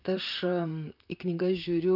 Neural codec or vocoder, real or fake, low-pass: vocoder, 44.1 kHz, 128 mel bands, Pupu-Vocoder; fake; 5.4 kHz